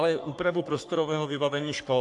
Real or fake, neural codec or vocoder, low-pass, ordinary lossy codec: fake; codec, 44.1 kHz, 3.4 kbps, Pupu-Codec; 10.8 kHz; AAC, 64 kbps